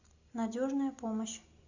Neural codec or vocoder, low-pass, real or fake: none; 7.2 kHz; real